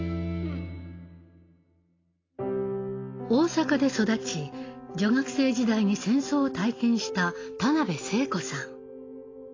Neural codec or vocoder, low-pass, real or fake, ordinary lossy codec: none; 7.2 kHz; real; AAC, 32 kbps